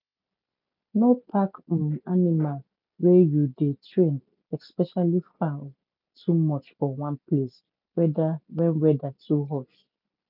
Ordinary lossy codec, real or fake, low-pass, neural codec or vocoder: none; real; 5.4 kHz; none